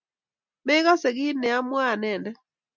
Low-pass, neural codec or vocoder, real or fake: 7.2 kHz; none; real